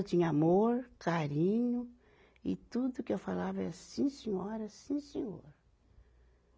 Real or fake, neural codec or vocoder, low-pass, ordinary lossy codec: real; none; none; none